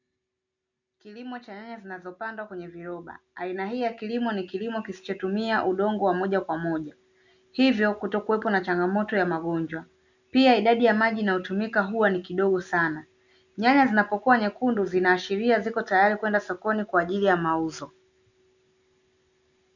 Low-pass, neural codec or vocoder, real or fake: 7.2 kHz; none; real